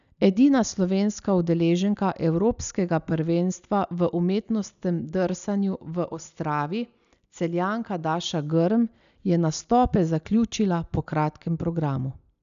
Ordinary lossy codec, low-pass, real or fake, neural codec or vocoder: none; 7.2 kHz; real; none